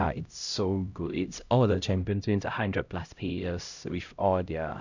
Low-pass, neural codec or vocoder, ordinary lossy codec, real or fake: 7.2 kHz; codec, 16 kHz, 0.5 kbps, X-Codec, HuBERT features, trained on LibriSpeech; none; fake